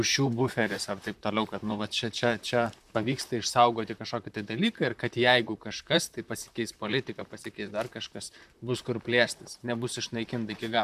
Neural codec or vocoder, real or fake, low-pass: vocoder, 44.1 kHz, 128 mel bands, Pupu-Vocoder; fake; 14.4 kHz